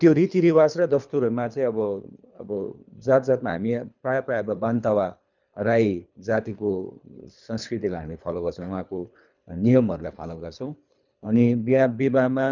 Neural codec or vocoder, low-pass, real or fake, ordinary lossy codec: codec, 24 kHz, 3 kbps, HILCodec; 7.2 kHz; fake; none